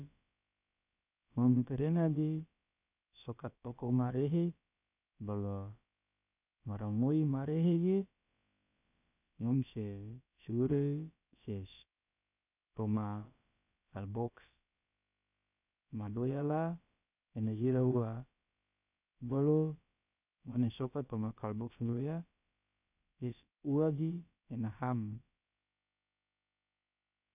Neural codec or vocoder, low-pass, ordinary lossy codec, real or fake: codec, 16 kHz, about 1 kbps, DyCAST, with the encoder's durations; 3.6 kHz; none; fake